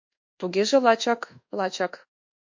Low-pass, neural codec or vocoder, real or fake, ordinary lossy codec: 7.2 kHz; codec, 24 kHz, 1.2 kbps, DualCodec; fake; MP3, 48 kbps